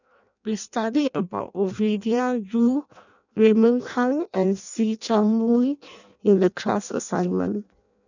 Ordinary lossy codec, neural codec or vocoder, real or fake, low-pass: none; codec, 16 kHz in and 24 kHz out, 0.6 kbps, FireRedTTS-2 codec; fake; 7.2 kHz